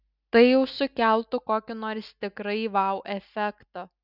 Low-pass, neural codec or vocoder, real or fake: 5.4 kHz; none; real